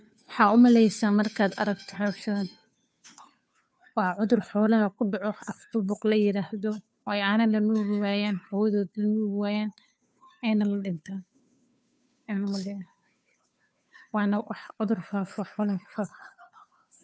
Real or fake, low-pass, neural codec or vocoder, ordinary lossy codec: fake; none; codec, 16 kHz, 2 kbps, FunCodec, trained on Chinese and English, 25 frames a second; none